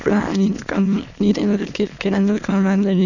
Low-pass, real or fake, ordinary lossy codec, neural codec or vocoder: 7.2 kHz; fake; none; autoencoder, 22.05 kHz, a latent of 192 numbers a frame, VITS, trained on many speakers